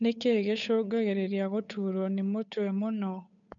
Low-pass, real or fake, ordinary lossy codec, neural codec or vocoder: 7.2 kHz; fake; none; codec, 16 kHz, 8 kbps, FunCodec, trained on Chinese and English, 25 frames a second